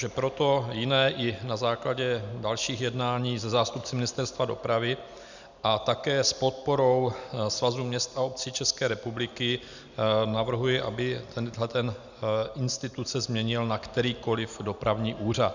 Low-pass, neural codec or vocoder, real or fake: 7.2 kHz; none; real